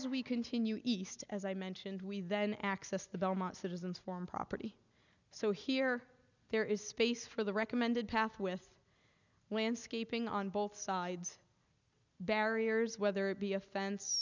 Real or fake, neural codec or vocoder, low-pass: real; none; 7.2 kHz